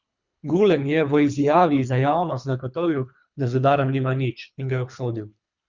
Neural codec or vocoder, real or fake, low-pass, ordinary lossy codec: codec, 24 kHz, 3 kbps, HILCodec; fake; 7.2 kHz; Opus, 64 kbps